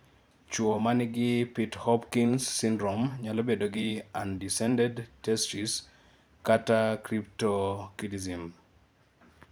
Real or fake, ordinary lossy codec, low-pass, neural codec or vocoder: fake; none; none; vocoder, 44.1 kHz, 128 mel bands every 512 samples, BigVGAN v2